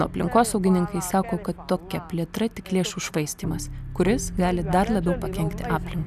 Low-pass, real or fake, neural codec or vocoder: 14.4 kHz; real; none